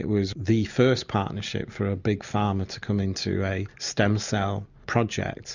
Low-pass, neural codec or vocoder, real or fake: 7.2 kHz; none; real